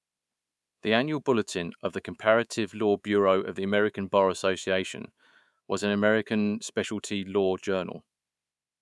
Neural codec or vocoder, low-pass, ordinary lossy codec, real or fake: codec, 24 kHz, 3.1 kbps, DualCodec; none; none; fake